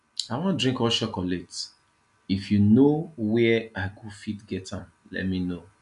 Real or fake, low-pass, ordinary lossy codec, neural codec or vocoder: real; 10.8 kHz; none; none